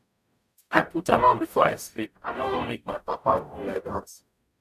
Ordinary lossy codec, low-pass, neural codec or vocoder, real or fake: none; 14.4 kHz; codec, 44.1 kHz, 0.9 kbps, DAC; fake